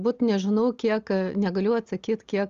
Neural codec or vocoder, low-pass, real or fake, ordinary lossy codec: none; 7.2 kHz; real; Opus, 32 kbps